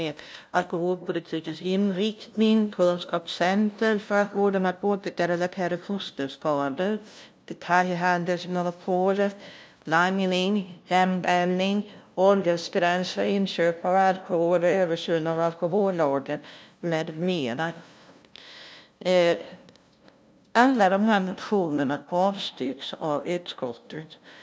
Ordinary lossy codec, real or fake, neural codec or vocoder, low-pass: none; fake; codec, 16 kHz, 0.5 kbps, FunCodec, trained on LibriTTS, 25 frames a second; none